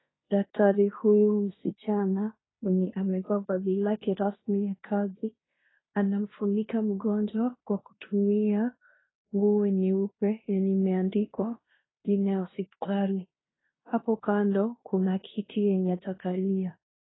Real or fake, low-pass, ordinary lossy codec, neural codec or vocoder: fake; 7.2 kHz; AAC, 16 kbps; codec, 16 kHz in and 24 kHz out, 0.9 kbps, LongCat-Audio-Codec, fine tuned four codebook decoder